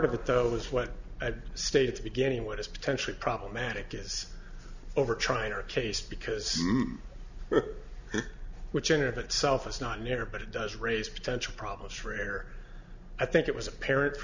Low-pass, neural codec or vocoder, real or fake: 7.2 kHz; none; real